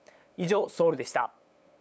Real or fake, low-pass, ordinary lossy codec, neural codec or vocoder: fake; none; none; codec, 16 kHz, 8 kbps, FunCodec, trained on LibriTTS, 25 frames a second